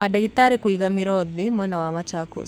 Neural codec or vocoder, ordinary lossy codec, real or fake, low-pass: codec, 44.1 kHz, 2.6 kbps, SNAC; none; fake; none